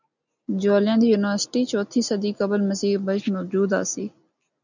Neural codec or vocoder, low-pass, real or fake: none; 7.2 kHz; real